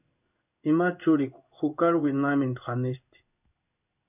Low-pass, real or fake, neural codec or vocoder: 3.6 kHz; fake; codec, 16 kHz in and 24 kHz out, 1 kbps, XY-Tokenizer